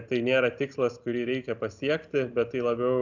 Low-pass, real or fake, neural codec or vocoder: 7.2 kHz; real; none